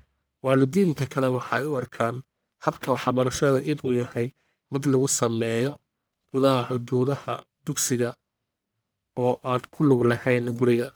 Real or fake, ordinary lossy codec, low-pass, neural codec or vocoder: fake; none; none; codec, 44.1 kHz, 1.7 kbps, Pupu-Codec